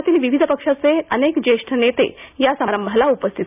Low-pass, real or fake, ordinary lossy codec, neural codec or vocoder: 3.6 kHz; real; none; none